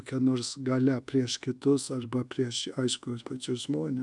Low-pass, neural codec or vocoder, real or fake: 10.8 kHz; codec, 24 kHz, 1.2 kbps, DualCodec; fake